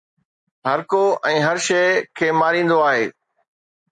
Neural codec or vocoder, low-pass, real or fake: none; 10.8 kHz; real